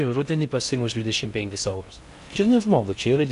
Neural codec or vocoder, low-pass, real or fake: codec, 16 kHz in and 24 kHz out, 0.6 kbps, FocalCodec, streaming, 2048 codes; 10.8 kHz; fake